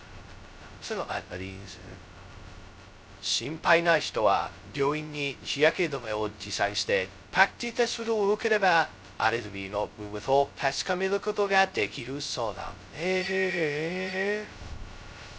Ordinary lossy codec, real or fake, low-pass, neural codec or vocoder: none; fake; none; codec, 16 kHz, 0.2 kbps, FocalCodec